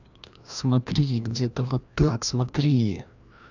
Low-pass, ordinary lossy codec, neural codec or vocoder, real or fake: 7.2 kHz; none; codec, 16 kHz, 1 kbps, FreqCodec, larger model; fake